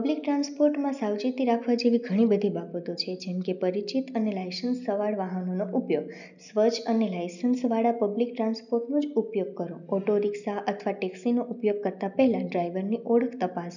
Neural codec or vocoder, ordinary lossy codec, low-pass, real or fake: none; none; 7.2 kHz; real